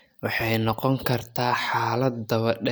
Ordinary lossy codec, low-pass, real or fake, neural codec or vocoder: none; none; real; none